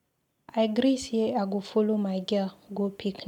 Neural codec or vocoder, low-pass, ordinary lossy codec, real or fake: none; 19.8 kHz; none; real